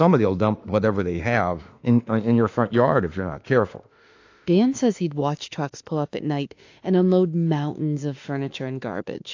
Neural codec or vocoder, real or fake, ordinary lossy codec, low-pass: autoencoder, 48 kHz, 32 numbers a frame, DAC-VAE, trained on Japanese speech; fake; AAC, 48 kbps; 7.2 kHz